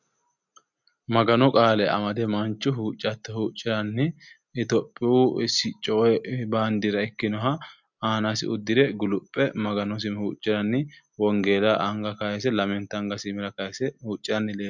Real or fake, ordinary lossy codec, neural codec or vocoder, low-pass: real; MP3, 64 kbps; none; 7.2 kHz